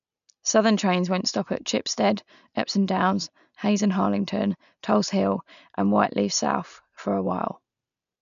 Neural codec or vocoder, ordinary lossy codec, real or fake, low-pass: none; none; real; 7.2 kHz